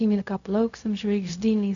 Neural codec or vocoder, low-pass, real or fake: codec, 16 kHz, 0.4 kbps, LongCat-Audio-Codec; 7.2 kHz; fake